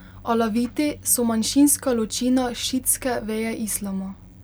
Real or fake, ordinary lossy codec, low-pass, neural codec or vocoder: real; none; none; none